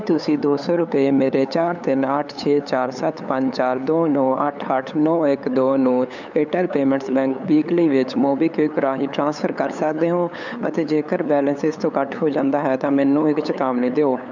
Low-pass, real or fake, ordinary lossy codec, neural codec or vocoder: 7.2 kHz; fake; none; codec, 16 kHz, 8 kbps, FunCodec, trained on LibriTTS, 25 frames a second